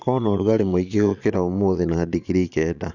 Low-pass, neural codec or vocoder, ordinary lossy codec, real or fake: 7.2 kHz; vocoder, 22.05 kHz, 80 mel bands, Vocos; none; fake